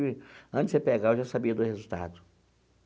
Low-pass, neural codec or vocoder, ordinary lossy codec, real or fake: none; none; none; real